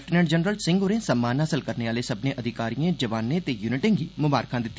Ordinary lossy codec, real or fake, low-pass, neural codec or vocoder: none; real; none; none